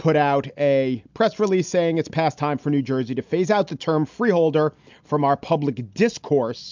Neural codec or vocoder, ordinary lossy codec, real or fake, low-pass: none; MP3, 64 kbps; real; 7.2 kHz